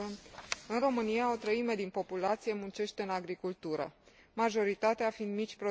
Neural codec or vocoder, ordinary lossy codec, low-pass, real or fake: none; none; none; real